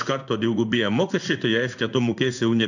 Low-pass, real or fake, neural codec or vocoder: 7.2 kHz; fake; codec, 16 kHz in and 24 kHz out, 1 kbps, XY-Tokenizer